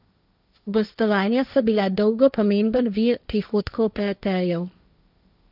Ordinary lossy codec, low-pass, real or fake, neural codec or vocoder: none; 5.4 kHz; fake; codec, 16 kHz, 1.1 kbps, Voila-Tokenizer